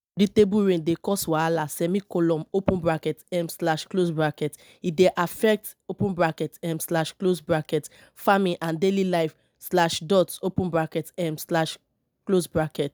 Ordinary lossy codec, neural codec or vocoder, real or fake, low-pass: none; none; real; none